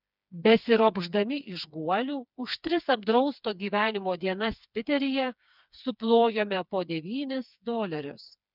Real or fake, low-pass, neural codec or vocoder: fake; 5.4 kHz; codec, 16 kHz, 4 kbps, FreqCodec, smaller model